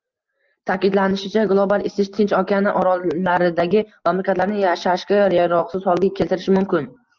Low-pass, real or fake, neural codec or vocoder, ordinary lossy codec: 7.2 kHz; real; none; Opus, 24 kbps